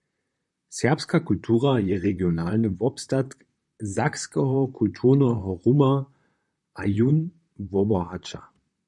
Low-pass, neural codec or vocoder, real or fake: 10.8 kHz; vocoder, 44.1 kHz, 128 mel bands, Pupu-Vocoder; fake